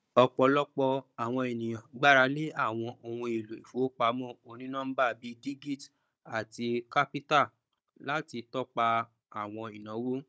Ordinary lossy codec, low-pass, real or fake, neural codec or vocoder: none; none; fake; codec, 16 kHz, 16 kbps, FunCodec, trained on Chinese and English, 50 frames a second